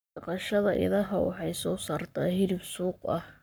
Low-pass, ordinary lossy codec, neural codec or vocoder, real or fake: none; none; codec, 44.1 kHz, 7.8 kbps, Pupu-Codec; fake